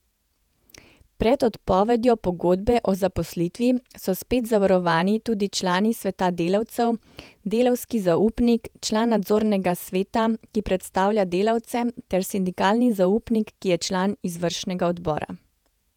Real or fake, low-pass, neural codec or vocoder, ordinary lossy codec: fake; 19.8 kHz; vocoder, 48 kHz, 128 mel bands, Vocos; none